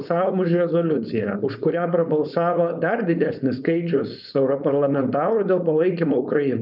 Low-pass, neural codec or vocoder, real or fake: 5.4 kHz; codec, 16 kHz, 4.8 kbps, FACodec; fake